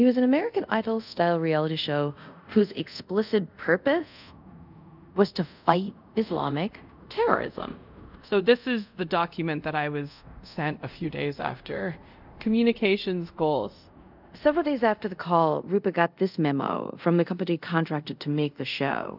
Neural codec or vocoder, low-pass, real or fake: codec, 24 kHz, 0.5 kbps, DualCodec; 5.4 kHz; fake